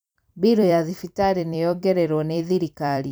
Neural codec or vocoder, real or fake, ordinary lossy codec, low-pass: vocoder, 44.1 kHz, 128 mel bands every 256 samples, BigVGAN v2; fake; none; none